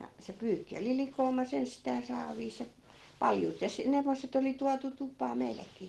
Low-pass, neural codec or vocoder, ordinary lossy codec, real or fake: 19.8 kHz; none; Opus, 16 kbps; real